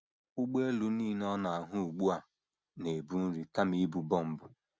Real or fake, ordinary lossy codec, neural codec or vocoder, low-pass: real; none; none; none